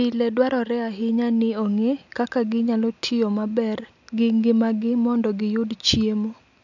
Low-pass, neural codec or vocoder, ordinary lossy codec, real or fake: 7.2 kHz; none; none; real